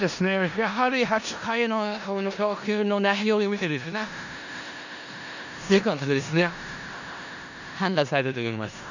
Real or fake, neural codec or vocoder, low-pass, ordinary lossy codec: fake; codec, 16 kHz in and 24 kHz out, 0.4 kbps, LongCat-Audio-Codec, four codebook decoder; 7.2 kHz; none